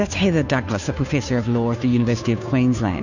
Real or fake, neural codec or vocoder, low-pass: fake; codec, 16 kHz, 2 kbps, FunCodec, trained on Chinese and English, 25 frames a second; 7.2 kHz